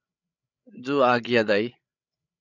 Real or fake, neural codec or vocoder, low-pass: fake; codec, 16 kHz, 16 kbps, FreqCodec, larger model; 7.2 kHz